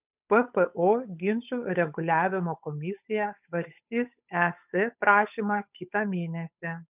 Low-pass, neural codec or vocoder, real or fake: 3.6 kHz; codec, 16 kHz, 8 kbps, FunCodec, trained on Chinese and English, 25 frames a second; fake